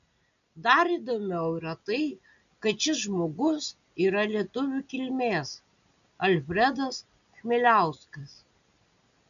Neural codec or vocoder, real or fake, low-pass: none; real; 7.2 kHz